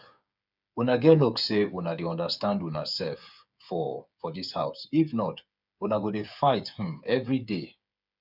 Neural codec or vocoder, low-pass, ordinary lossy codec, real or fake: codec, 16 kHz, 8 kbps, FreqCodec, smaller model; 5.4 kHz; none; fake